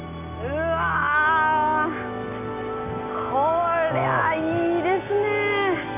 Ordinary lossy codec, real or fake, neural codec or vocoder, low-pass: none; real; none; 3.6 kHz